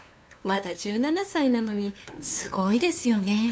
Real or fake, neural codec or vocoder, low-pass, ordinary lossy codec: fake; codec, 16 kHz, 2 kbps, FunCodec, trained on LibriTTS, 25 frames a second; none; none